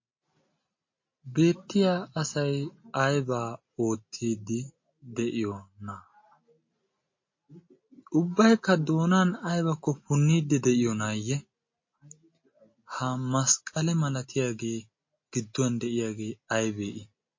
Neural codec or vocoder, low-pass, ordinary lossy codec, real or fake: none; 7.2 kHz; MP3, 32 kbps; real